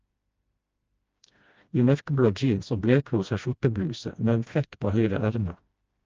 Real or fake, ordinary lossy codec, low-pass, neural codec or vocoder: fake; Opus, 24 kbps; 7.2 kHz; codec, 16 kHz, 1 kbps, FreqCodec, smaller model